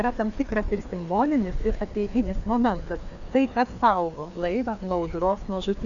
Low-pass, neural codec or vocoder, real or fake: 7.2 kHz; codec, 16 kHz, 2 kbps, FreqCodec, larger model; fake